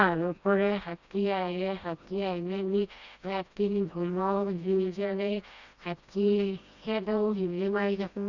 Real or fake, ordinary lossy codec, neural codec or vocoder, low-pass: fake; none; codec, 16 kHz, 1 kbps, FreqCodec, smaller model; 7.2 kHz